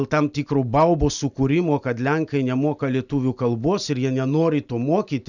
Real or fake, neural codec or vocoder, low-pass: real; none; 7.2 kHz